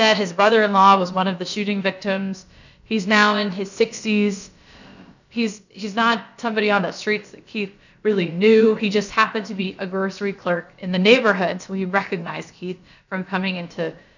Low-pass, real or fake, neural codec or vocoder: 7.2 kHz; fake; codec, 16 kHz, about 1 kbps, DyCAST, with the encoder's durations